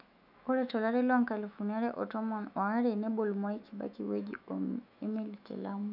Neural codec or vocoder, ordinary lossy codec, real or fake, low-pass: autoencoder, 48 kHz, 128 numbers a frame, DAC-VAE, trained on Japanese speech; none; fake; 5.4 kHz